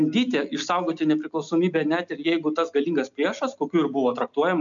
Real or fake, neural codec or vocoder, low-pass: real; none; 7.2 kHz